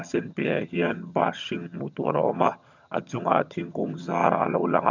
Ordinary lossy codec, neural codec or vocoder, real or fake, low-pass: none; vocoder, 22.05 kHz, 80 mel bands, HiFi-GAN; fake; 7.2 kHz